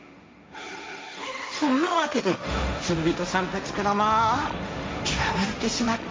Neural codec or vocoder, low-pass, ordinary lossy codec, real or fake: codec, 16 kHz, 1.1 kbps, Voila-Tokenizer; none; none; fake